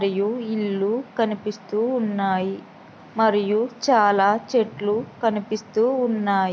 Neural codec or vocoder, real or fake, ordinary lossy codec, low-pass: none; real; none; none